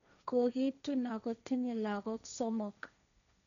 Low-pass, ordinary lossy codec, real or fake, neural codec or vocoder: 7.2 kHz; none; fake; codec, 16 kHz, 1.1 kbps, Voila-Tokenizer